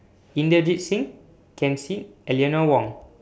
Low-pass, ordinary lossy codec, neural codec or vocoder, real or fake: none; none; none; real